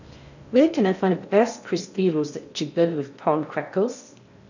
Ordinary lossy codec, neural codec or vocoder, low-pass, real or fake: none; codec, 16 kHz in and 24 kHz out, 0.6 kbps, FocalCodec, streaming, 2048 codes; 7.2 kHz; fake